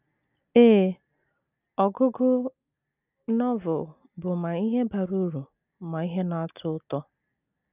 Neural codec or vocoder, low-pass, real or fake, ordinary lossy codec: none; 3.6 kHz; real; none